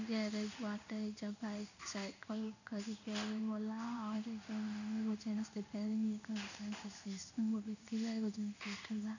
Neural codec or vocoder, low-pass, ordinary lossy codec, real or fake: codec, 16 kHz in and 24 kHz out, 1 kbps, XY-Tokenizer; 7.2 kHz; none; fake